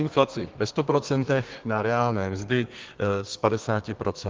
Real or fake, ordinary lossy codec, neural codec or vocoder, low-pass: fake; Opus, 16 kbps; codec, 32 kHz, 1.9 kbps, SNAC; 7.2 kHz